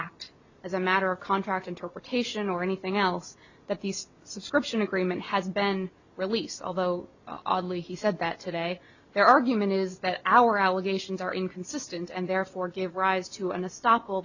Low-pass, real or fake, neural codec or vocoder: 7.2 kHz; real; none